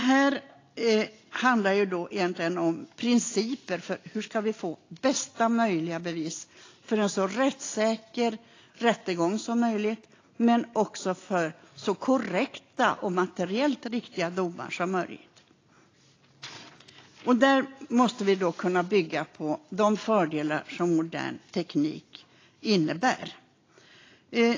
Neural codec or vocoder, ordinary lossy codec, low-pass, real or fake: none; AAC, 32 kbps; 7.2 kHz; real